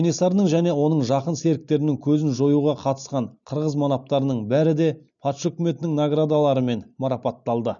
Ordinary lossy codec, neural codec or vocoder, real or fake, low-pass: none; none; real; 7.2 kHz